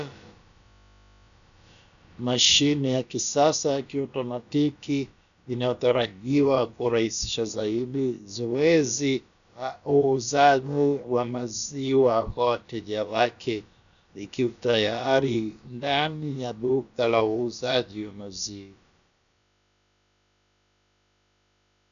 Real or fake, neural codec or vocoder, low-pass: fake; codec, 16 kHz, about 1 kbps, DyCAST, with the encoder's durations; 7.2 kHz